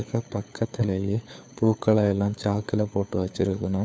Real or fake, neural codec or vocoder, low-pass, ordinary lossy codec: fake; codec, 16 kHz, 4 kbps, FunCodec, trained on LibriTTS, 50 frames a second; none; none